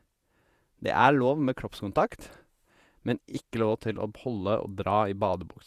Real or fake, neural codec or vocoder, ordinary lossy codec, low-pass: fake; vocoder, 48 kHz, 128 mel bands, Vocos; Opus, 64 kbps; 14.4 kHz